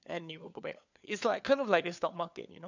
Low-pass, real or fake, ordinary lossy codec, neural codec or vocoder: 7.2 kHz; fake; none; codec, 16 kHz, 4 kbps, FunCodec, trained on LibriTTS, 50 frames a second